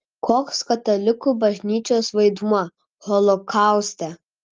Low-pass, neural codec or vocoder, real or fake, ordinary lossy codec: 7.2 kHz; none; real; Opus, 32 kbps